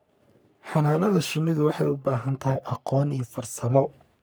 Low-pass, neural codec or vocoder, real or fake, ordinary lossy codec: none; codec, 44.1 kHz, 3.4 kbps, Pupu-Codec; fake; none